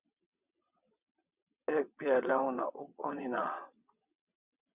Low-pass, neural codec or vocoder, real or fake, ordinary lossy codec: 3.6 kHz; vocoder, 22.05 kHz, 80 mel bands, WaveNeXt; fake; Opus, 64 kbps